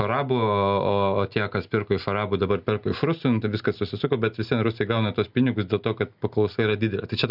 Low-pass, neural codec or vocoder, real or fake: 5.4 kHz; none; real